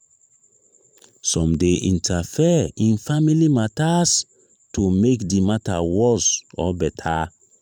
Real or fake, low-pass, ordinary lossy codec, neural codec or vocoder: fake; 19.8 kHz; none; vocoder, 44.1 kHz, 128 mel bands every 512 samples, BigVGAN v2